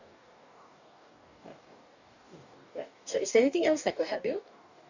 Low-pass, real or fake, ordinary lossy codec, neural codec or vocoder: 7.2 kHz; fake; none; codec, 44.1 kHz, 2.6 kbps, DAC